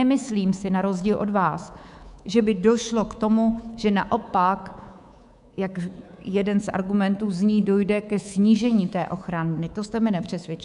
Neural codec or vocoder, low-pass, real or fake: codec, 24 kHz, 3.1 kbps, DualCodec; 10.8 kHz; fake